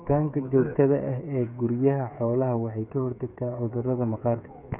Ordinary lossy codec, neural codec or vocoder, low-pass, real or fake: none; codec, 16 kHz, 8 kbps, FreqCodec, smaller model; 3.6 kHz; fake